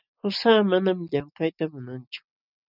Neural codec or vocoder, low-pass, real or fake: none; 5.4 kHz; real